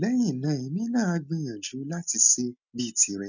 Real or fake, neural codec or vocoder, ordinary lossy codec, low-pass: real; none; none; 7.2 kHz